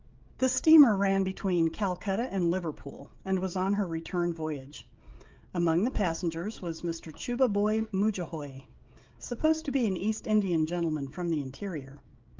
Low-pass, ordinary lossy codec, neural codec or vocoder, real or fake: 7.2 kHz; Opus, 32 kbps; codec, 16 kHz, 16 kbps, FreqCodec, smaller model; fake